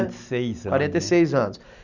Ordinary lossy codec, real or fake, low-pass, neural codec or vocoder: none; real; 7.2 kHz; none